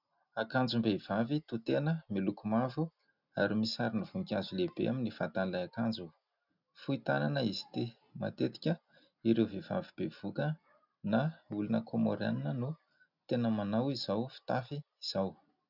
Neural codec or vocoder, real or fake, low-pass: none; real; 5.4 kHz